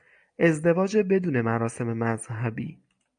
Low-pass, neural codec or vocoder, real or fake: 9.9 kHz; none; real